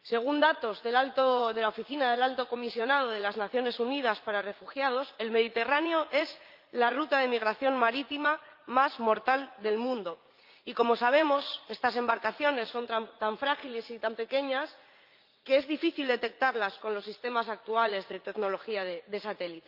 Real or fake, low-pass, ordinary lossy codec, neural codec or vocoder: fake; 5.4 kHz; Opus, 24 kbps; vocoder, 44.1 kHz, 80 mel bands, Vocos